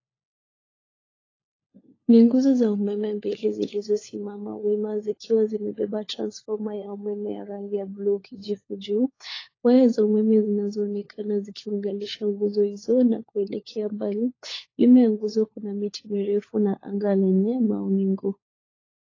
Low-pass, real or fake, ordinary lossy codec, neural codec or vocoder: 7.2 kHz; fake; AAC, 32 kbps; codec, 16 kHz, 4 kbps, FunCodec, trained on LibriTTS, 50 frames a second